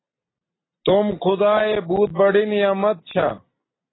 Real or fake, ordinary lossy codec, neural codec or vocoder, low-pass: real; AAC, 16 kbps; none; 7.2 kHz